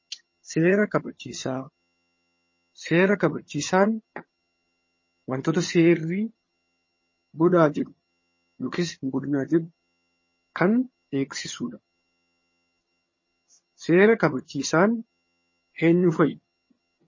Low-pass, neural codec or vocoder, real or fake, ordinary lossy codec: 7.2 kHz; vocoder, 22.05 kHz, 80 mel bands, HiFi-GAN; fake; MP3, 32 kbps